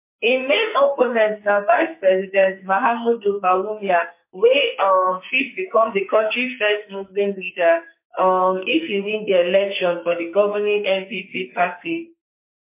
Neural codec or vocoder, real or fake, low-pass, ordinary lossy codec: codec, 44.1 kHz, 2.6 kbps, SNAC; fake; 3.6 kHz; MP3, 24 kbps